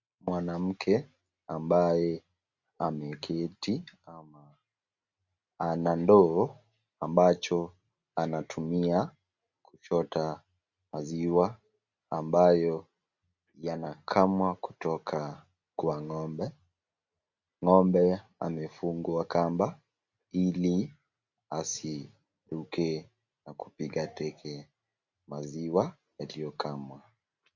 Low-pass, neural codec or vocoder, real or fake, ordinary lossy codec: 7.2 kHz; none; real; Opus, 64 kbps